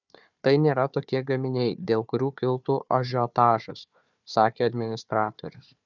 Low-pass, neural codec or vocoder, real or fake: 7.2 kHz; codec, 16 kHz, 4 kbps, FunCodec, trained on Chinese and English, 50 frames a second; fake